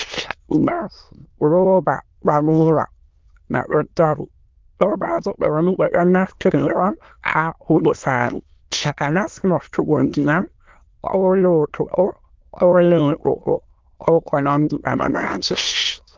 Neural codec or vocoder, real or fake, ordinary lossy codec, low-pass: autoencoder, 22.05 kHz, a latent of 192 numbers a frame, VITS, trained on many speakers; fake; Opus, 24 kbps; 7.2 kHz